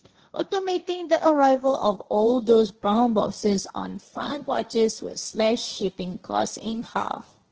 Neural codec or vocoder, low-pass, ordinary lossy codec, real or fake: codec, 16 kHz, 1.1 kbps, Voila-Tokenizer; 7.2 kHz; Opus, 16 kbps; fake